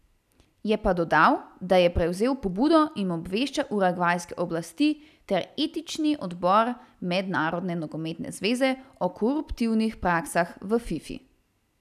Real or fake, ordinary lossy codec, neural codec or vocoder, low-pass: real; none; none; 14.4 kHz